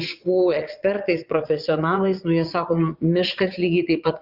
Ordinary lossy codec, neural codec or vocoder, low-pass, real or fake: Opus, 64 kbps; vocoder, 22.05 kHz, 80 mel bands, Vocos; 5.4 kHz; fake